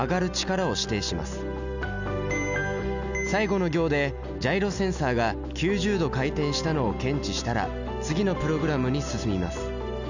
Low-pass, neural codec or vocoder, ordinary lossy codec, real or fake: 7.2 kHz; none; none; real